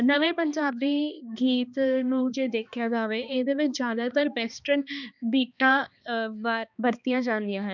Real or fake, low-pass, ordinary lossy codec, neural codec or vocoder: fake; 7.2 kHz; none; codec, 16 kHz, 2 kbps, X-Codec, HuBERT features, trained on balanced general audio